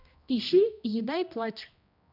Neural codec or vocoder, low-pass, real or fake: codec, 16 kHz, 1 kbps, X-Codec, HuBERT features, trained on general audio; 5.4 kHz; fake